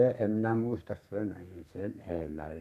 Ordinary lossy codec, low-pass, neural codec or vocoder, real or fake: none; 14.4 kHz; codec, 32 kHz, 1.9 kbps, SNAC; fake